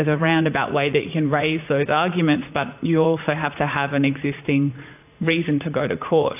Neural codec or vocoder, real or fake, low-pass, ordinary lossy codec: vocoder, 44.1 kHz, 80 mel bands, Vocos; fake; 3.6 kHz; AAC, 32 kbps